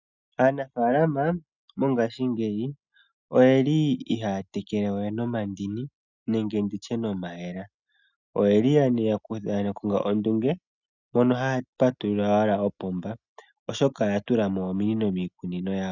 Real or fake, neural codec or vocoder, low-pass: real; none; 7.2 kHz